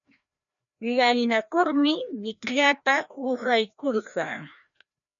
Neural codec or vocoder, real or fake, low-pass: codec, 16 kHz, 1 kbps, FreqCodec, larger model; fake; 7.2 kHz